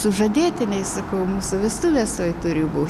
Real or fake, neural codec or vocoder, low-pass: real; none; 14.4 kHz